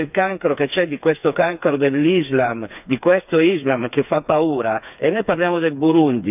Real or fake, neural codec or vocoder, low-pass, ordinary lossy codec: fake; codec, 16 kHz, 4 kbps, FreqCodec, smaller model; 3.6 kHz; none